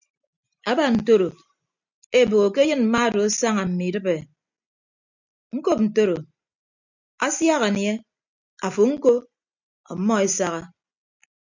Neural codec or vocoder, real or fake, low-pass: none; real; 7.2 kHz